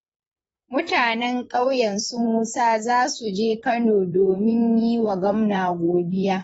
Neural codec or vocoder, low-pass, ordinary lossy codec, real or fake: vocoder, 44.1 kHz, 128 mel bands, Pupu-Vocoder; 19.8 kHz; AAC, 24 kbps; fake